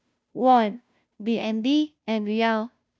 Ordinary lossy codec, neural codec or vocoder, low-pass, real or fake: none; codec, 16 kHz, 0.5 kbps, FunCodec, trained on Chinese and English, 25 frames a second; none; fake